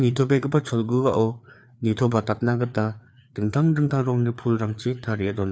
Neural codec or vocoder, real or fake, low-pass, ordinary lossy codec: codec, 16 kHz, 4 kbps, FreqCodec, larger model; fake; none; none